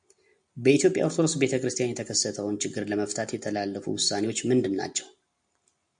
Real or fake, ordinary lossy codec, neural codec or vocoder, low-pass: real; MP3, 64 kbps; none; 9.9 kHz